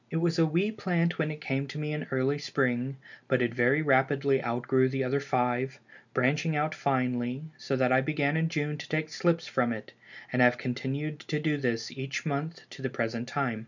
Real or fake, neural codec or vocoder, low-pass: real; none; 7.2 kHz